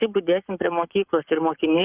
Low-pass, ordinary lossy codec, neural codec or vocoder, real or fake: 3.6 kHz; Opus, 64 kbps; codec, 44.1 kHz, 7.8 kbps, Pupu-Codec; fake